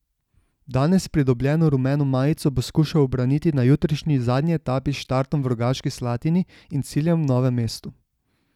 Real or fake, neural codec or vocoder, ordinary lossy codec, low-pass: real; none; none; 19.8 kHz